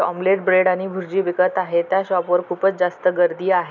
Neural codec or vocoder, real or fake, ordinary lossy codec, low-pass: none; real; none; 7.2 kHz